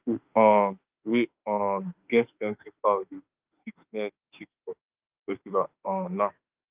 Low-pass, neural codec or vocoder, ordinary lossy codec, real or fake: 3.6 kHz; autoencoder, 48 kHz, 32 numbers a frame, DAC-VAE, trained on Japanese speech; Opus, 24 kbps; fake